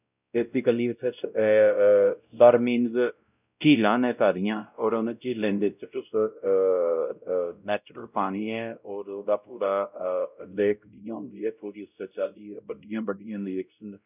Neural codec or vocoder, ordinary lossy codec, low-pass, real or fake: codec, 16 kHz, 0.5 kbps, X-Codec, WavLM features, trained on Multilingual LibriSpeech; none; 3.6 kHz; fake